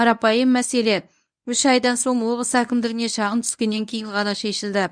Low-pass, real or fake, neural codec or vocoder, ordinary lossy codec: 9.9 kHz; fake; codec, 24 kHz, 0.9 kbps, WavTokenizer, medium speech release version 1; none